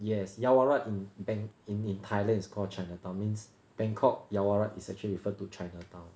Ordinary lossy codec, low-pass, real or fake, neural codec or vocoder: none; none; real; none